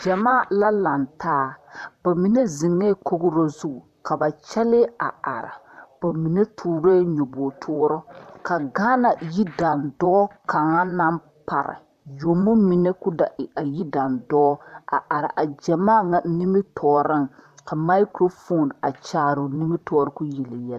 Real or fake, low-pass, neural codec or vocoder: fake; 14.4 kHz; vocoder, 44.1 kHz, 128 mel bands, Pupu-Vocoder